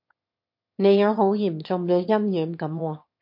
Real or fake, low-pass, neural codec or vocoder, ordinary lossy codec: fake; 5.4 kHz; autoencoder, 22.05 kHz, a latent of 192 numbers a frame, VITS, trained on one speaker; MP3, 32 kbps